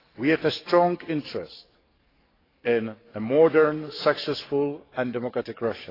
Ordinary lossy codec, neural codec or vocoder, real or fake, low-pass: AAC, 24 kbps; codec, 44.1 kHz, 7.8 kbps, DAC; fake; 5.4 kHz